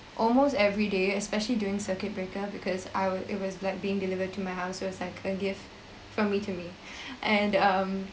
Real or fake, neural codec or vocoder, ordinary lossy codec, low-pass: real; none; none; none